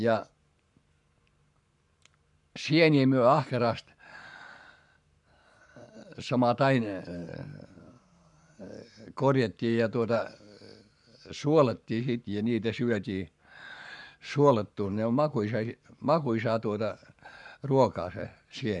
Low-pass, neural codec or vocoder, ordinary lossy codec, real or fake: 10.8 kHz; codec, 44.1 kHz, 7.8 kbps, Pupu-Codec; none; fake